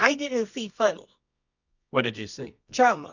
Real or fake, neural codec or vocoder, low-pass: fake; codec, 24 kHz, 0.9 kbps, WavTokenizer, medium music audio release; 7.2 kHz